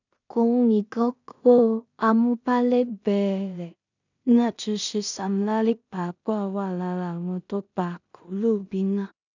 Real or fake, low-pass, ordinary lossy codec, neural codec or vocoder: fake; 7.2 kHz; none; codec, 16 kHz in and 24 kHz out, 0.4 kbps, LongCat-Audio-Codec, two codebook decoder